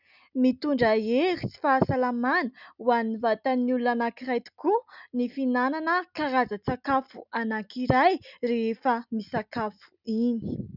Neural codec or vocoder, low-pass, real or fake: none; 5.4 kHz; real